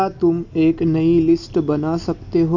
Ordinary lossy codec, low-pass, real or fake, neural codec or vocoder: none; 7.2 kHz; real; none